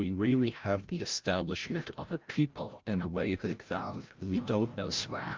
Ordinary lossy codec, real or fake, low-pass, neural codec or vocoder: Opus, 32 kbps; fake; 7.2 kHz; codec, 16 kHz, 0.5 kbps, FreqCodec, larger model